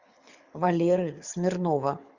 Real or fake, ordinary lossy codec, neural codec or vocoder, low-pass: fake; Opus, 64 kbps; codec, 24 kHz, 6 kbps, HILCodec; 7.2 kHz